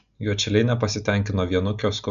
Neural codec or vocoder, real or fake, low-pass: none; real; 7.2 kHz